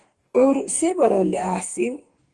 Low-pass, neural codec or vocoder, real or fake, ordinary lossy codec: 10.8 kHz; codec, 44.1 kHz, 2.6 kbps, DAC; fake; Opus, 24 kbps